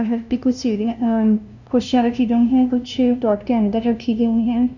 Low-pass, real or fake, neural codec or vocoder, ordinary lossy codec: 7.2 kHz; fake; codec, 16 kHz, 0.5 kbps, FunCodec, trained on LibriTTS, 25 frames a second; none